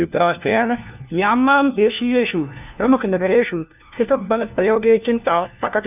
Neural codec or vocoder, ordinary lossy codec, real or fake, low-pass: codec, 16 kHz, 1 kbps, FunCodec, trained on LibriTTS, 50 frames a second; none; fake; 3.6 kHz